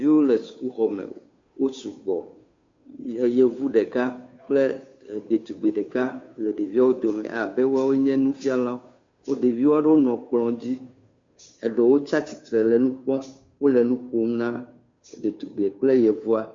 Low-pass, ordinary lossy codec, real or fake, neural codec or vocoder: 7.2 kHz; MP3, 48 kbps; fake; codec, 16 kHz, 2 kbps, FunCodec, trained on Chinese and English, 25 frames a second